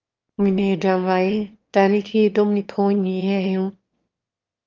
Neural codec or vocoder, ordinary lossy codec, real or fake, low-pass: autoencoder, 22.05 kHz, a latent of 192 numbers a frame, VITS, trained on one speaker; Opus, 24 kbps; fake; 7.2 kHz